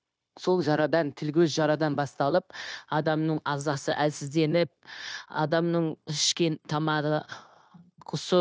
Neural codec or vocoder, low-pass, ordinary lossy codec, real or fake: codec, 16 kHz, 0.9 kbps, LongCat-Audio-Codec; none; none; fake